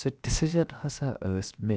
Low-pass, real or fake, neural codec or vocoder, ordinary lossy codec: none; fake; codec, 16 kHz, 0.7 kbps, FocalCodec; none